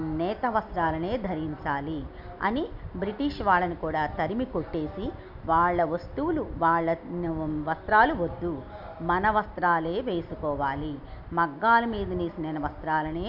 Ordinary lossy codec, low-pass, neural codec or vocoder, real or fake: none; 5.4 kHz; none; real